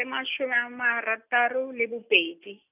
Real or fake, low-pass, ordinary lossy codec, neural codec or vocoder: real; 3.6 kHz; none; none